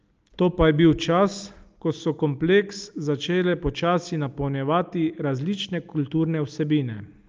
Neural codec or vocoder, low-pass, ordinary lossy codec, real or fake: none; 7.2 kHz; Opus, 24 kbps; real